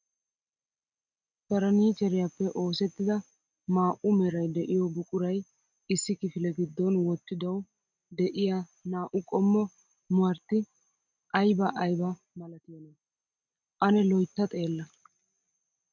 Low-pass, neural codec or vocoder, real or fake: 7.2 kHz; none; real